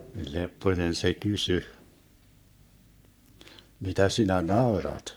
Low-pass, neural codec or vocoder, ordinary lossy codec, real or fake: none; codec, 44.1 kHz, 3.4 kbps, Pupu-Codec; none; fake